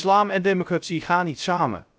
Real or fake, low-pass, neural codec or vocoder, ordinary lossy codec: fake; none; codec, 16 kHz, 0.3 kbps, FocalCodec; none